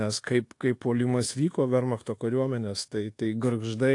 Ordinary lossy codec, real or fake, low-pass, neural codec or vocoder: AAC, 48 kbps; fake; 10.8 kHz; codec, 24 kHz, 1.2 kbps, DualCodec